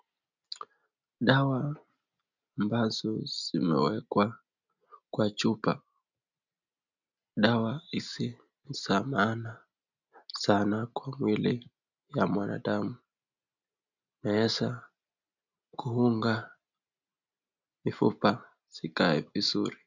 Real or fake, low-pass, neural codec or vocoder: real; 7.2 kHz; none